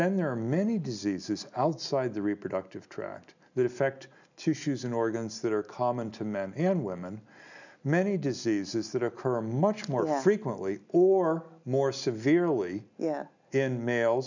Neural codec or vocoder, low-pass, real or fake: none; 7.2 kHz; real